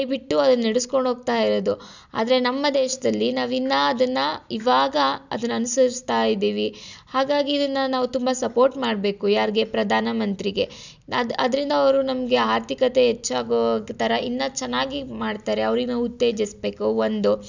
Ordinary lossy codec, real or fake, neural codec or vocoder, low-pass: none; real; none; 7.2 kHz